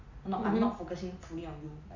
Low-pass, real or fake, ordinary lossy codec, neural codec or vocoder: 7.2 kHz; real; Opus, 64 kbps; none